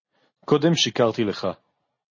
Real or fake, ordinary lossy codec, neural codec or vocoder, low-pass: real; MP3, 32 kbps; none; 7.2 kHz